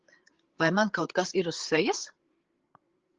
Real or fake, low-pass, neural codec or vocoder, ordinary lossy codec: fake; 7.2 kHz; codec, 16 kHz, 8 kbps, FreqCodec, larger model; Opus, 16 kbps